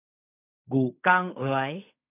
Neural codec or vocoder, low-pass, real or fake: codec, 16 kHz in and 24 kHz out, 0.4 kbps, LongCat-Audio-Codec, fine tuned four codebook decoder; 3.6 kHz; fake